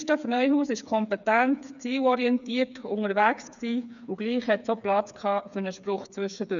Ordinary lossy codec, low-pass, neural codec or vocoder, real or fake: none; 7.2 kHz; codec, 16 kHz, 4 kbps, FreqCodec, smaller model; fake